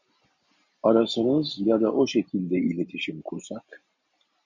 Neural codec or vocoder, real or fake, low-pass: none; real; 7.2 kHz